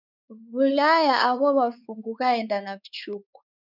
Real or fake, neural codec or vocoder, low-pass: fake; codec, 16 kHz, 4 kbps, X-Codec, WavLM features, trained on Multilingual LibriSpeech; 5.4 kHz